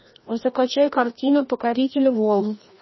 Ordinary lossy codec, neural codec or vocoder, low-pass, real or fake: MP3, 24 kbps; codec, 16 kHz, 1 kbps, FreqCodec, larger model; 7.2 kHz; fake